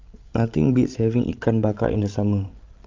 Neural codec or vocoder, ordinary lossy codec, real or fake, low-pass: codec, 16 kHz, 16 kbps, FreqCodec, larger model; Opus, 32 kbps; fake; 7.2 kHz